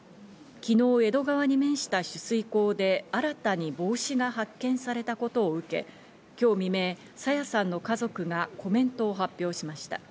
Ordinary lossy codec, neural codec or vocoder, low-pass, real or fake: none; none; none; real